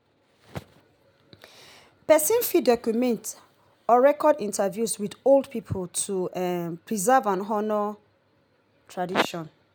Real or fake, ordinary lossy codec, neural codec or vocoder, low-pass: real; none; none; none